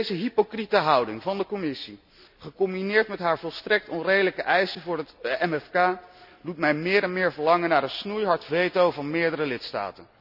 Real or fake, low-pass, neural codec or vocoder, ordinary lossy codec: real; 5.4 kHz; none; none